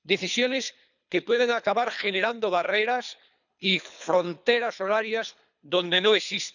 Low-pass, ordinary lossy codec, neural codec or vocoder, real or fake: 7.2 kHz; none; codec, 24 kHz, 3 kbps, HILCodec; fake